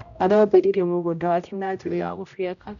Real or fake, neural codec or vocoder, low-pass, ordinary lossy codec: fake; codec, 16 kHz, 0.5 kbps, X-Codec, HuBERT features, trained on general audio; 7.2 kHz; none